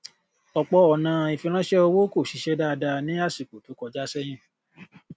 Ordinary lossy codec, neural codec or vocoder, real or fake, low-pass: none; none; real; none